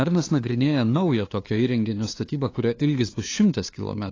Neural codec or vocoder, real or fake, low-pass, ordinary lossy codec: codec, 16 kHz, 2 kbps, FunCodec, trained on LibriTTS, 25 frames a second; fake; 7.2 kHz; AAC, 32 kbps